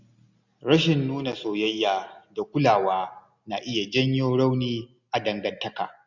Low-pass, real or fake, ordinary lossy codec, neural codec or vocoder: 7.2 kHz; real; none; none